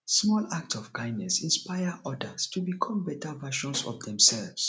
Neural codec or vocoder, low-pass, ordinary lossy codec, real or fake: none; none; none; real